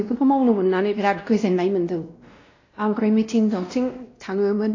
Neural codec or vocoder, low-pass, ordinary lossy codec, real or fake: codec, 16 kHz, 1 kbps, X-Codec, WavLM features, trained on Multilingual LibriSpeech; 7.2 kHz; AAC, 32 kbps; fake